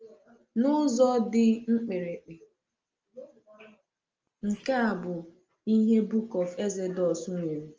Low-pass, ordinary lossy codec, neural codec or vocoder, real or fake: 7.2 kHz; Opus, 24 kbps; none; real